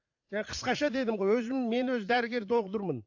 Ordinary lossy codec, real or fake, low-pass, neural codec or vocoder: AAC, 48 kbps; fake; 7.2 kHz; vocoder, 44.1 kHz, 80 mel bands, Vocos